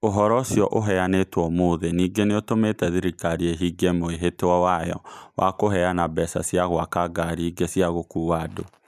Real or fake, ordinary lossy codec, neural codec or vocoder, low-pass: real; none; none; 14.4 kHz